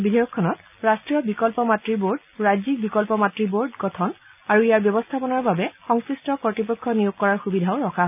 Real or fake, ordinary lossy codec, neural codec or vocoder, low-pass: real; none; none; 3.6 kHz